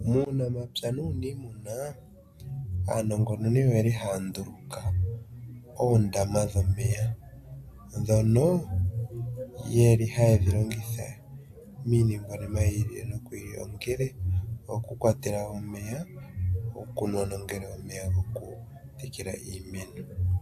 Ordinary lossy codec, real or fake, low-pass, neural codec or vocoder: AAC, 96 kbps; real; 14.4 kHz; none